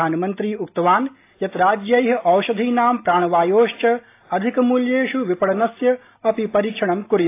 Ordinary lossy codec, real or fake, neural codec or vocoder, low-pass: AAC, 24 kbps; real; none; 3.6 kHz